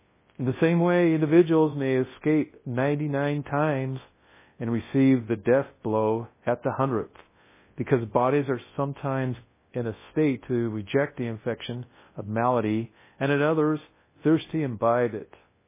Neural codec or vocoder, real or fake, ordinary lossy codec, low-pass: codec, 24 kHz, 0.9 kbps, WavTokenizer, large speech release; fake; MP3, 16 kbps; 3.6 kHz